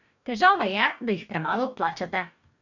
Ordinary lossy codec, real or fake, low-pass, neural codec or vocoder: none; fake; 7.2 kHz; codec, 44.1 kHz, 2.6 kbps, DAC